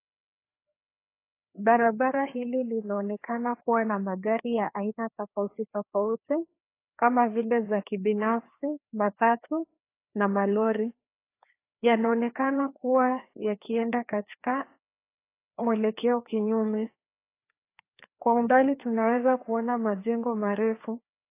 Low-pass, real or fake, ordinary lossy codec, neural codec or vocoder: 3.6 kHz; fake; AAC, 24 kbps; codec, 16 kHz, 2 kbps, FreqCodec, larger model